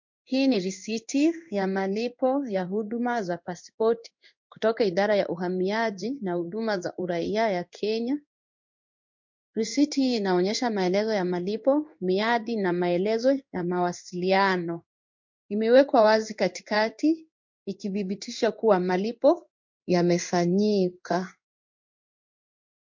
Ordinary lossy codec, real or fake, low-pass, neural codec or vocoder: MP3, 48 kbps; fake; 7.2 kHz; codec, 16 kHz in and 24 kHz out, 1 kbps, XY-Tokenizer